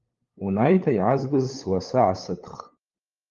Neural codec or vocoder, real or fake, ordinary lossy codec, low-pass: codec, 16 kHz, 8 kbps, FunCodec, trained on LibriTTS, 25 frames a second; fake; Opus, 32 kbps; 7.2 kHz